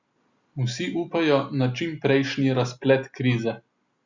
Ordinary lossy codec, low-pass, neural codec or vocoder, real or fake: Opus, 64 kbps; 7.2 kHz; none; real